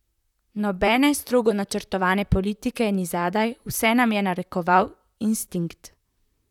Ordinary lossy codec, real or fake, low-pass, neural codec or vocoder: none; fake; 19.8 kHz; vocoder, 44.1 kHz, 128 mel bands, Pupu-Vocoder